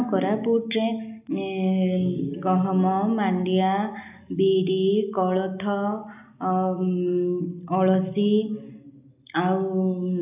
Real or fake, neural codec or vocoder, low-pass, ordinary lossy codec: real; none; 3.6 kHz; none